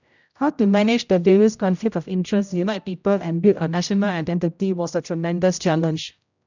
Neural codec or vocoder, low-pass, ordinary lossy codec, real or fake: codec, 16 kHz, 0.5 kbps, X-Codec, HuBERT features, trained on general audio; 7.2 kHz; none; fake